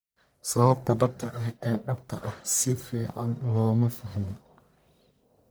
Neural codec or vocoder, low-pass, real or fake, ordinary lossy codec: codec, 44.1 kHz, 1.7 kbps, Pupu-Codec; none; fake; none